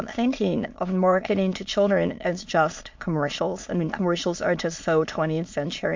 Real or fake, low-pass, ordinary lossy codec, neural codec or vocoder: fake; 7.2 kHz; MP3, 48 kbps; autoencoder, 22.05 kHz, a latent of 192 numbers a frame, VITS, trained on many speakers